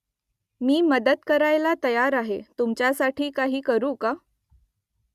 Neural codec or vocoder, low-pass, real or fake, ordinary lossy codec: none; 14.4 kHz; real; Opus, 64 kbps